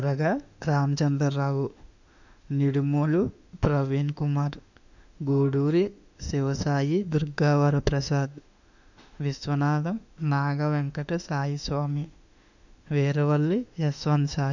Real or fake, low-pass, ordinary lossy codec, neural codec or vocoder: fake; 7.2 kHz; none; autoencoder, 48 kHz, 32 numbers a frame, DAC-VAE, trained on Japanese speech